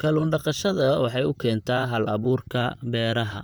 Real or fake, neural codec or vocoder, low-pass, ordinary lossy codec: fake; vocoder, 44.1 kHz, 128 mel bands, Pupu-Vocoder; none; none